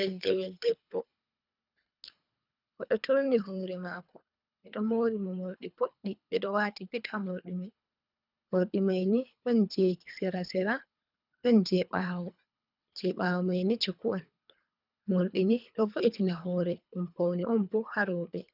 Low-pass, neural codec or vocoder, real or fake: 5.4 kHz; codec, 24 kHz, 3 kbps, HILCodec; fake